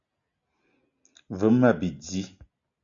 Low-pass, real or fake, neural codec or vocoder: 7.2 kHz; real; none